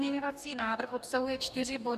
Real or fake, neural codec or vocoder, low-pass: fake; codec, 44.1 kHz, 2.6 kbps, DAC; 14.4 kHz